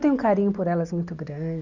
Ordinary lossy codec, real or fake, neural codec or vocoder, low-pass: none; real; none; 7.2 kHz